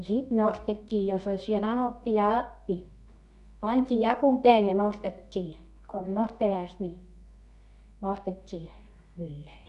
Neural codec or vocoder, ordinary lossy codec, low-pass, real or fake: codec, 24 kHz, 0.9 kbps, WavTokenizer, medium music audio release; none; 10.8 kHz; fake